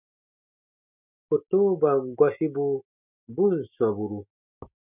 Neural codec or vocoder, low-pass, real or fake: none; 3.6 kHz; real